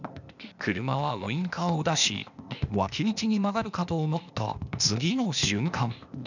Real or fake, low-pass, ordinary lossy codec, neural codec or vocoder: fake; 7.2 kHz; none; codec, 16 kHz, 0.8 kbps, ZipCodec